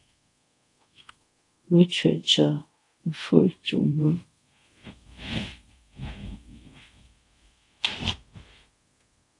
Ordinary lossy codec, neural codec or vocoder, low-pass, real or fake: AAC, 48 kbps; codec, 24 kHz, 0.5 kbps, DualCodec; 10.8 kHz; fake